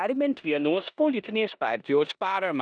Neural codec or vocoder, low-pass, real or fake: codec, 16 kHz in and 24 kHz out, 0.9 kbps, LongCat-Audio-Codec, four codebook decoder; 9.9 kHz; fake